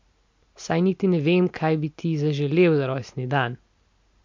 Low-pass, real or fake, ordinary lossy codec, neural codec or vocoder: 7.2 kHz; real; MP3, 48 kbps; none